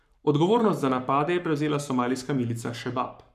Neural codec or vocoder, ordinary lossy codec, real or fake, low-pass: codec, 44.1 kHz, 7.8 kbps, Pupu-Codec; none; fake; 14.4 kHz